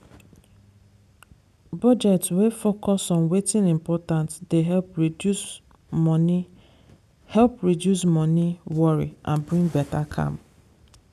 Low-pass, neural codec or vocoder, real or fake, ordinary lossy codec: 14.4 kHz; none; real; none